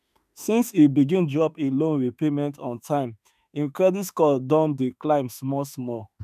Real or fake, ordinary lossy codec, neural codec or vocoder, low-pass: fake; none; autoencoder, 48 kHz, 32 numbers a frame, DAC-VAE, trained on Japanese speech; 14.4 kHz